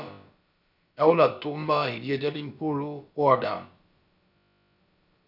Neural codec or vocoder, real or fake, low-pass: codec, 16 kHz, about 1 kbps, DyCAST, with the encoder's durations; fake; 5.4 kHz